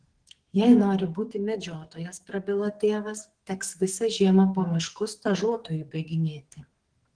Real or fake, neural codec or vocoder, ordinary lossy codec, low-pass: fake; codec, 44.1 kHz, 2.6 kbps, SNAC; Opus, 16 kbps; 9.9 kHz